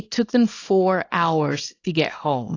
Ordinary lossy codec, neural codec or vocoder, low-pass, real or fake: AAC, 32 kbps; codec, 24 kHz, 0.9 kbps, WavTokenizer, small release; 7.2 kHz; fake